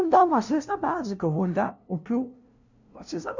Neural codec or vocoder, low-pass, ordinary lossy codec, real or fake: codec, 16 kHz, 0.5 kbps, FunCodec, trained on LibriTTS, 25 frames a second; 7.2 kHz; none; fake